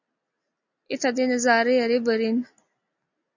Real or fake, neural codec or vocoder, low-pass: real; none; 7.2 kHz